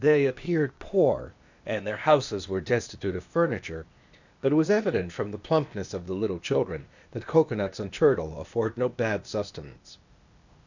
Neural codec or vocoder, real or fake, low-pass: codec, 16 kHz, 0.8 kbps, ZipCodec; fake; 7.2 kHz